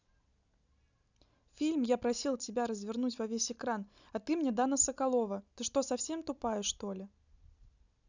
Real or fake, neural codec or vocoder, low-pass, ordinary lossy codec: real; none; 7.2 kHz; none